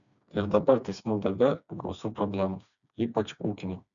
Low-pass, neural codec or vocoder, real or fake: 7.2 kHz; codec, 16 kHz, 2 kbps, FreqCodec, smaller model; fake